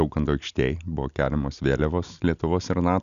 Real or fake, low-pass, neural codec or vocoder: real; 7.2 kHz; none